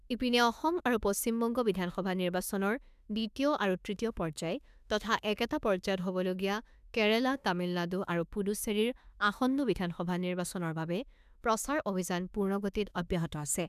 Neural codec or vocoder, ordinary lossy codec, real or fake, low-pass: autoencoder, 48 kHz, 32 numbers a frame, DAC-VAE, trained on Japanese speech; none; fake; 14.4 kHz